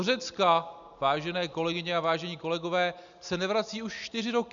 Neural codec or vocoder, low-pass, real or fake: none; 7.2 kHz; real